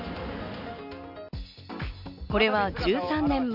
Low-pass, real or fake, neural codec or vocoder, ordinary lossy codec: 5.4 kHz; real; none; none